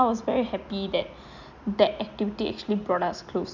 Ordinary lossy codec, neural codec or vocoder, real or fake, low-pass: none; none; real; 7.2 kHz